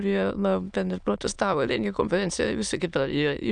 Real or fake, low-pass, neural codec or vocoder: fake; 9.9 kHz; autoencoder, 22.05 kHz, a latent of 192 numbers a frame, VITS, trained on many speakers